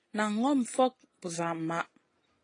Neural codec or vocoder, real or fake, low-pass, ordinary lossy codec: none; real; 9.9 kHz; AAC, 32 kbps